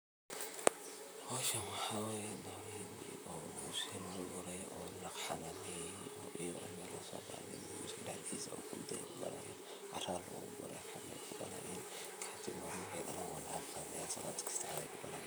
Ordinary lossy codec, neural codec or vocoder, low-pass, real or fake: none; vocoder, 44.1 kHz, 128 mel bands, Pupu-Vocoder; none; fake